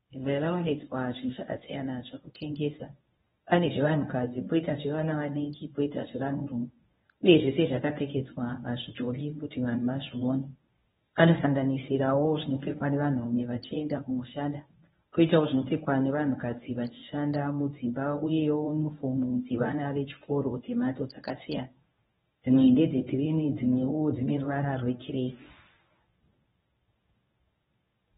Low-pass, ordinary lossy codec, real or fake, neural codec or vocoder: 10.8 kHz; AAC, 16 kbps; fake; codec, 24 kHz, 0.9 kbps, WavTokenizer, medium speech release version 1